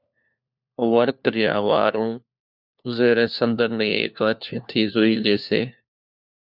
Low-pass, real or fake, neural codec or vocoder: 5.4 kHz; fake; codec, 16 kHz, 1 kbps, FunCodec, trained on LibriTTS, 50 frames a second